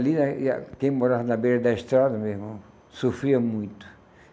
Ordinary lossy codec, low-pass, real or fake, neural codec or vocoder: none; none; real; none